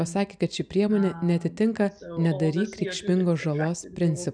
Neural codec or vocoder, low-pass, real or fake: none; 9.9 kHz; real